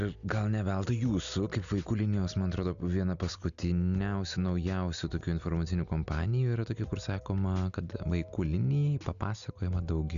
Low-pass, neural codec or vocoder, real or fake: 7.2 kHz; none; real